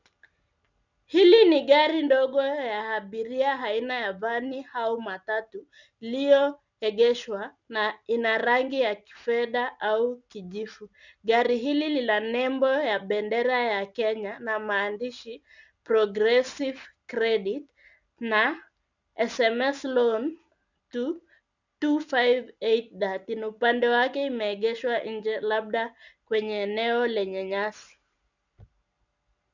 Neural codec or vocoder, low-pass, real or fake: none; 7.2 kHz; real